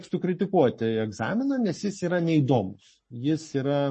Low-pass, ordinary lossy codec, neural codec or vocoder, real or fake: 10.8 kHz; MP3, 32 kbps; codec, 44.1 kHz, 7.8 kbps, Pupu-Codec; fake